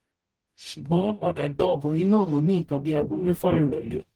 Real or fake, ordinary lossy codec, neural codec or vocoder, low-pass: fake; Opus, 24 kbps; codec, 44.1 kHz, 0.9 kbps, DAC; 19.8 kHz